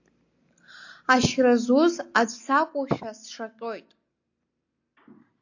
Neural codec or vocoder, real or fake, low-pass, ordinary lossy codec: none; real; 7.2 kHz; AAC, 48 kbps